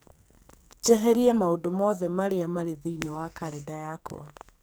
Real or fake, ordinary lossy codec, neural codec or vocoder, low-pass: fake; none; codec, 44.1 kHz, 2.6 kbps, SNAC; none